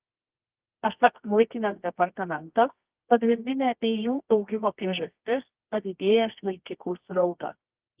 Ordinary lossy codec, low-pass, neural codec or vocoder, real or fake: Opus, 32 kbps; 3.6 kHz; codec, 24 kHz, 0.9 kbps, WavTokenizer, medium music audio release; fake